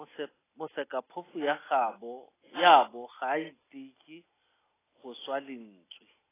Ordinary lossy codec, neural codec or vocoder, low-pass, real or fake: AAC, 16 kbps; none; 3.6 kHz; real